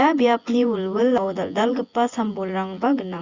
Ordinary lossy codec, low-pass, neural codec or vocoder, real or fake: Opus, 64 kbps; 7.2 kHz; vocoder, 24 kHz, 100 mel bands, Vocos; fake